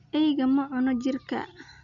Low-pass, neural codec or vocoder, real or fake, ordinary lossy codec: 7.2 kHz; none; real; none